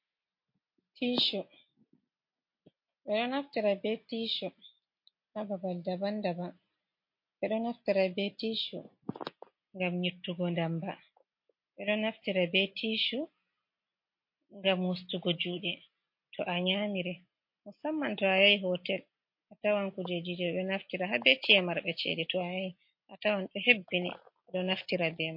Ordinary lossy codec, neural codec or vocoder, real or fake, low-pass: MP3, 32 kbps; none; real; 5.4 kHz